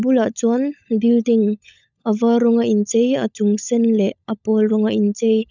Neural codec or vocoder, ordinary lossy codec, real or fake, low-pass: codec, 16 kHz, 16 kbps, FunCodec, trained on LibriTTS, 50 frames a second; none; fake; 7.2 kHz